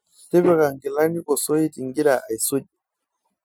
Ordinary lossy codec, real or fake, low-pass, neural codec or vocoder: none; real; none; none